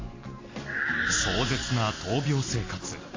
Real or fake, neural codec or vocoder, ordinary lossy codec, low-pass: real; none; AAC, 32 kbps; 7.2 kHz